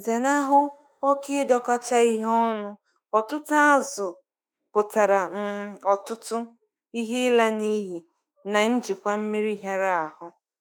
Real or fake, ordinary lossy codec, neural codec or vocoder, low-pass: fake; none; autoencoder, 48 kHz, 32 numbers a frame, DAC-VAE, trained on Japanese speech; none